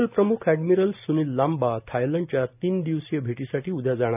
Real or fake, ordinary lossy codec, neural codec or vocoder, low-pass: real; none; none; 3.6 kHz